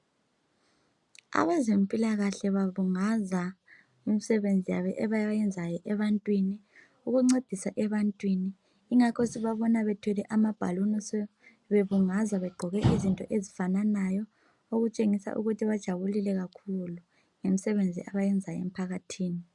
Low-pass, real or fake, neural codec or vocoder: 10.8 kHz; real; none